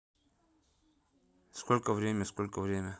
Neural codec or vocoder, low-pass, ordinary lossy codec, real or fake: none; none; none; real